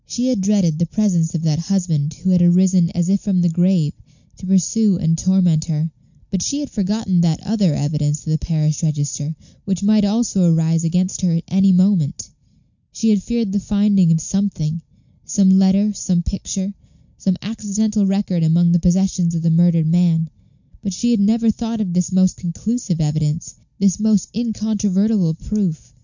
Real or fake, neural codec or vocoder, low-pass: real; none; 7.2 kHz